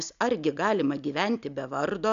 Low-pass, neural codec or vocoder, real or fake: 7.2 kHz; none; real